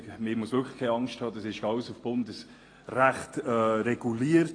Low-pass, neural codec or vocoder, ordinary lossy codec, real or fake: 9.9 kHz; none; AAC, 32 kbps; real